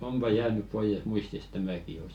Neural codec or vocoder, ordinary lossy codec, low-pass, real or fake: none; MP3, 96 kbps; 19.8 kHz; real